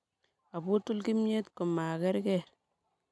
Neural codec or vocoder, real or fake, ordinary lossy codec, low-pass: none; real; none; none